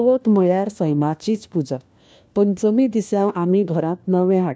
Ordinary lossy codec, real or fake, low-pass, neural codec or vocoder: none; fake; none; codec, 16 kHz, 1 kbps, FunCodec, trained on LibriTTS, 50 frames a second